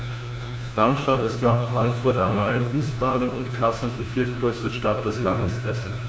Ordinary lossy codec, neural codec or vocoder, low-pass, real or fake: none; codec, 16 kHz, 1 kbps, FunCodec, trained on LibriTTS, 50 frames a second; none; fake